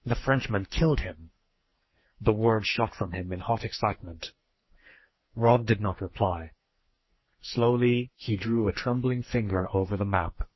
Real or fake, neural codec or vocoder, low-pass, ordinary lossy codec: fake; codec, 44.1 kHz, 2.6 kbps, SNAC; 7.2 kHz; MP3, 24 kbps